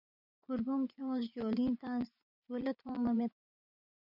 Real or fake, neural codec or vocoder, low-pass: fake; vocoder, 24 kHz, 100 mel bands, Vocos; 5.4 kHz